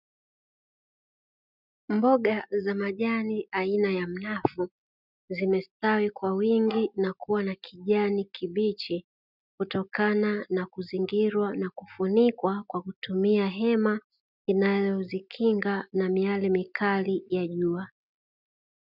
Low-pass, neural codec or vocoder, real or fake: 5.4 kHz; none; real